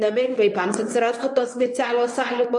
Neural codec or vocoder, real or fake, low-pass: codec, 24 kHz, 0.9 kbps, WavTokenizer, medium speech release version 1; fake; 10.8 kHz